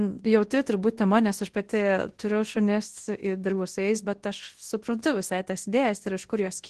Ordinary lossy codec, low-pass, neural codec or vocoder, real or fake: Opus, 16 kbps; 10.8 kHz; codec, 24 kHz, 0.9 kbps, WavTokenizer, large speech release; fake